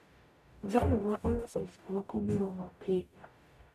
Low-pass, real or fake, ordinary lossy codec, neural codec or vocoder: 14.4 kHz; fake; none; codec, 44.1 kHz, 0.9 kbps, DAC